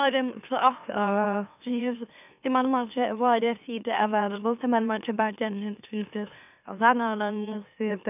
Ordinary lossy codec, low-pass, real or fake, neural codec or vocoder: none; 3.6 kHz; fake; autoencoder, 44.1 kHz, a latent of 192 numbers a frame, MeloTTS